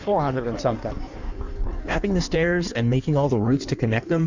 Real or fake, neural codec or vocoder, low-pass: fake; codec, 16 kHz in and 24 kHz out, 1.1 kbps, FireRedTTS-2 codec; 7.2 kHz